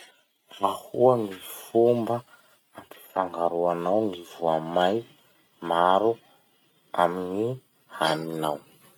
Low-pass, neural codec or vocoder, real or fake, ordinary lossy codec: 19.8 kHz; none; real; none